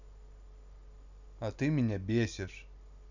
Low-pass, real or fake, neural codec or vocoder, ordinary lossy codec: 7.2 kHz; real; none; none